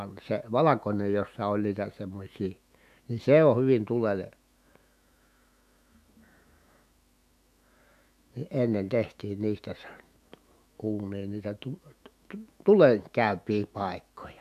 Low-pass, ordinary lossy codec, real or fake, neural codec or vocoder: 14.4 kHz; none; fake; autoencoder, 48 kHz, 128 numbers a frame, DAC-VAE, trained on Japanese speech